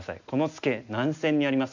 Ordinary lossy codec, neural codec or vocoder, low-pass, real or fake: none; none; 7.2 kHz; real